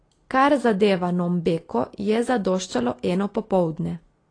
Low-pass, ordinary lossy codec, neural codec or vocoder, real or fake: 9.9 kHz; AAC, 32 kbps; none; real